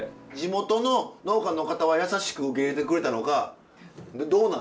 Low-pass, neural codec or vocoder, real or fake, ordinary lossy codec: none; none; real; none